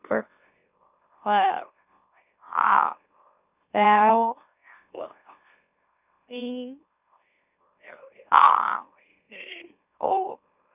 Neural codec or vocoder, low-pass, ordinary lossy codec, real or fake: autoencoder, 44.1 kHz, a latent of 192 numbers a frame, MeloTTS; 3.6 kHz; none; fake